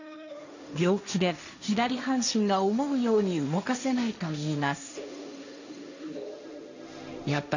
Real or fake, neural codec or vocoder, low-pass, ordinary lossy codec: fake; codec, 16 kHz, 1.1 kbps, Voila-Tokenizer; 7.2 kHz; none